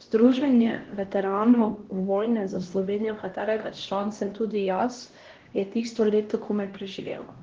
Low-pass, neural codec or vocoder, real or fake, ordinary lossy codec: 7.2 kHz; codec, 16 kHz, 1 kbps, X-Codec, HuBERT features, trained on LibriSpeech; fake; Opus, 16 kbps